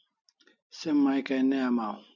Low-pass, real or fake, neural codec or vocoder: 7.2 kHz; real; none